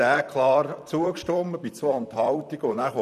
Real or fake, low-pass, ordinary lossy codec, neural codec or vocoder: fake; 14.4 kHz; none; vocoder, 44.1 kHz, 128 mel bands, Pupu-Vocoder